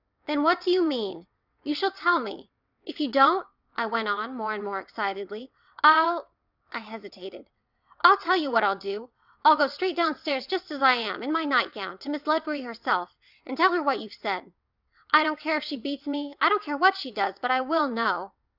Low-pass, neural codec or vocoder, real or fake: 5.4 kHz; vocoder, 22.05 kHz, 80 mel bands, WaveNeXt; fake